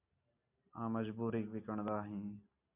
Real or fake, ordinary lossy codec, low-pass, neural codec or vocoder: real; MP3, 32 kbps; 3.6 kHz; none